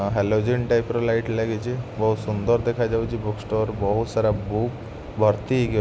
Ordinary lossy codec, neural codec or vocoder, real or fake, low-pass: none; none; real; none